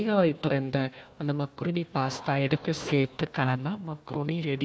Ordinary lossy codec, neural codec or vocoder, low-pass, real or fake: none; codec, 16 kHz, 1 kbps, FunCodec, trained on Chinese and English, 50 frames a second; none; fake